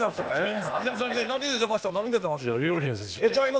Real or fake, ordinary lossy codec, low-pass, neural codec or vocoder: fake; none; none; codec, 16 kHz, 0.8 kbps, ZipCodec